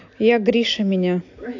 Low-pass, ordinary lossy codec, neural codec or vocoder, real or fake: 7.2 kHz; AAC, 48 kbps; none; real